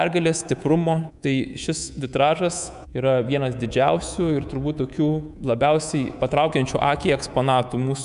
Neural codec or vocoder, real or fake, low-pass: codec, 24 kHz, 3.1 kbps, DualCodec; fake; 10.8 kHz